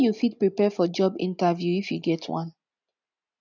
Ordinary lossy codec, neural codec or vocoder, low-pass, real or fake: none; none; 7.2 kHz; real